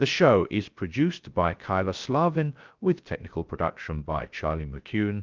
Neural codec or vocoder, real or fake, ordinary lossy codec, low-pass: codec, 16 kHz, 0.3 kbps, FocalCodec; fake; Opus, 32 kbps; 7.2 kHz